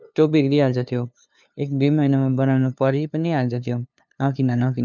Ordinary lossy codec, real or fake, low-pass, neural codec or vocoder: none; fake; none; codec, 16 kHz, 2 kbps, FunCodec, trained on LibriTTS, 25 frames a second